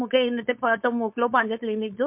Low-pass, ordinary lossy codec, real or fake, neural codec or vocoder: 3.6 kHz; MP3, 32 kbps; fake; codec, 16 kHz, 4.8 kbps, FACodec